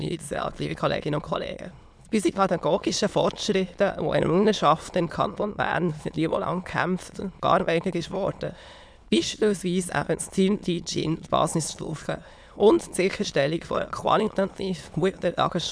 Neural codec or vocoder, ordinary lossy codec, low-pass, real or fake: autoencoder, 22.05 kHz, a latent of 192 numbers a frame, VITS, trained on many speakers; none; none; fake